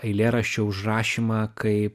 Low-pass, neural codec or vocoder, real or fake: 14.4 kHz; none; real